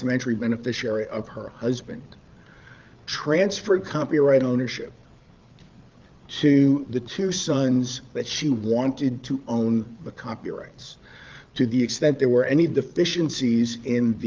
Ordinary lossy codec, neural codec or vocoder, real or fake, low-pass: Opus, 24 kbps; codec, 16 kHz, 8 kbps, FreqCodec, larger model; fake; 7.2 kHz